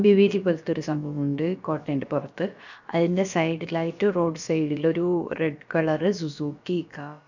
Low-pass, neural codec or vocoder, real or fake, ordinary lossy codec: 7.2 kHz; codec, 16 kHz, about 1 kbps, DyCAST, with the encoder's durations; fake; none